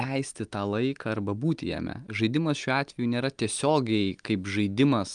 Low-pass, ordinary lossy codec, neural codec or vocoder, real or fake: 9.9 kHz; Opus, 32 kbps; none; real